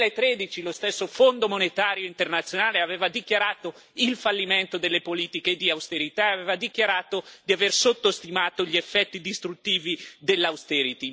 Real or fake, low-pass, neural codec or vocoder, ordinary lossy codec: real; none; none; none